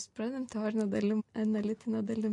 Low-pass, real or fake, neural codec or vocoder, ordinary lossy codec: 10.8 kHz; real; none; MP3, 48 kbps